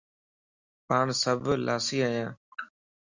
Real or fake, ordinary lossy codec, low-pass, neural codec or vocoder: real; Opus, 64 kbps; 7.2 kHz; none